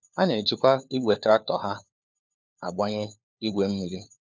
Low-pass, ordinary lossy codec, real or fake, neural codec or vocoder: none; none; fake; codec, 16 kHz, 4 kbps, FunCodec, trained on LibriTTS, 50 frames a second